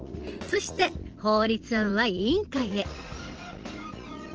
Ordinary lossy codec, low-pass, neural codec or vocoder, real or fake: Opus, 16 kbps; 7.2 kHz; vocoder, 44.1 kHz, 80 mel bands, Vocos; fake